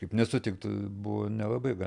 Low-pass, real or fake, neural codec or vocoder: 10.8 kHz; real; none